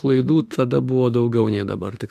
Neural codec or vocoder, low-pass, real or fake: autoencoder, 48 kHz, 32 numbers a frame, DAC-VAE, trained on Japanese speech; 14.4 kHz; fake